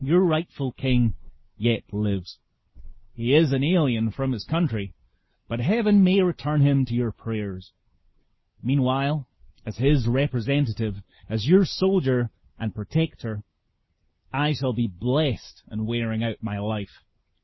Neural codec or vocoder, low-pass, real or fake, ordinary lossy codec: none; 7.2 kHz; real; MP3, 24 kbps